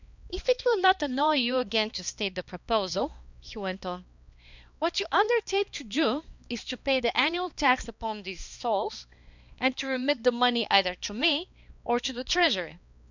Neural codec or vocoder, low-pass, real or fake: codec, 16 kHz, 2 kbps, X-Codec, HuBERT features, trained on balanced general audio; 7.2 kHz; fake